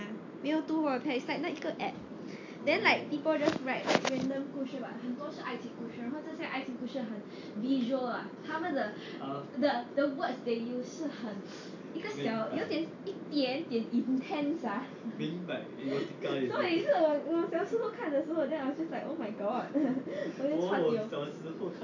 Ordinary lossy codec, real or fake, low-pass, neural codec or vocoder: AAC, 48 kbps; real; 7.2 kHz; none